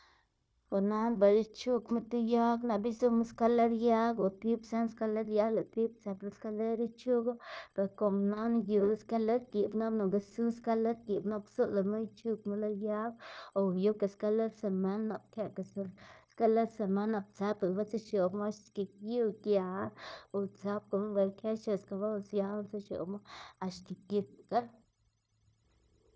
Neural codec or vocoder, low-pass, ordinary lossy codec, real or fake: codec, 16 kHz, 0.9 kbps, LongCat-Audio-Codec; none; none; fake